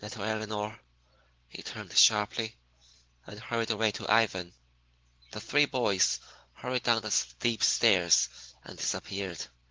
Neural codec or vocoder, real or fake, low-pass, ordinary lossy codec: none; real; 7.2 kHz; Opus, 16 kbps